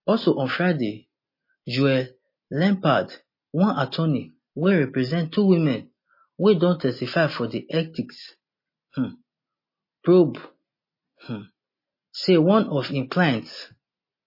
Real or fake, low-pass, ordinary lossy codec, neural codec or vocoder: real; 5.4 kHz; MP3, 24 kbps; none